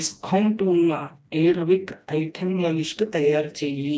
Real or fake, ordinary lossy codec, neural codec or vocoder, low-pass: fake; none; codec, 16 kHz, 1 kbps, FreqCodec, smaller model; none